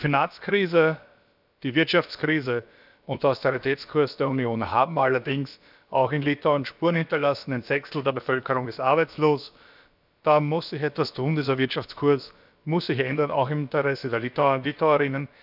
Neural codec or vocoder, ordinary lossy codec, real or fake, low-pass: codec, 16 kHz, about 1 kbps, DyCAST, with the encoder's durations; none; fake; 5.4 kHz